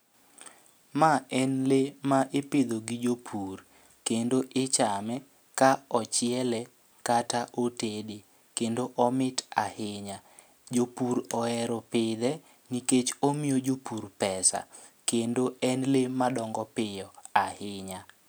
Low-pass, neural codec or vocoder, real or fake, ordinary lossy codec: none; none; real; none